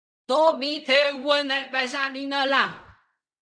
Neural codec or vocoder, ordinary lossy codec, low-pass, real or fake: codec, 16 kHz in and 24 kHz out, 0.4 kbps, LongCat-Audio-Codec, fine tuned four codebook decoder; MP3, 96 kbps; 9.9 kHz; fake